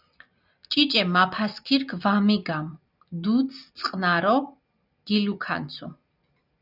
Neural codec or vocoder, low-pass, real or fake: none; 5.4 kHz; real